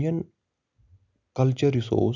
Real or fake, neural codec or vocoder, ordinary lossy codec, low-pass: real; none; none; 7.2 kHz